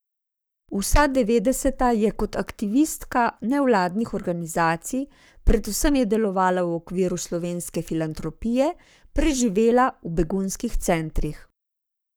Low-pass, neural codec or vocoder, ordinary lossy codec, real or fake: none; codec, 44.1 kHz, 7.8 kbps, Pupu-Codec; none; fake